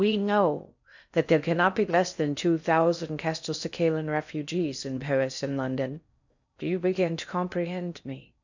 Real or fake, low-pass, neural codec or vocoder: fake; 7.2 kHz; codec, 16 kHz in and 24 kHz out, 0.6 kbps, FocalCodec, streaming, 4096 codes